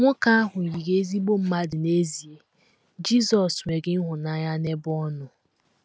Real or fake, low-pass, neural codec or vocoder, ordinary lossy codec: real; none; none; none